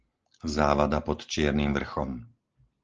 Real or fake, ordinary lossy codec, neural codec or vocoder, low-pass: real; Opus, 16 kbps; none; 7.2 kHz